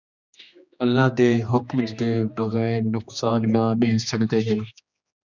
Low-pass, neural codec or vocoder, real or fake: 7.2 kHz; codec, 16 kHz, 2 kbps, X-Codec, HuBERT features, trained on general audio; fake